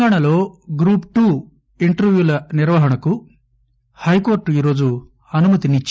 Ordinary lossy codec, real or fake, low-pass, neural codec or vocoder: none; real; none; none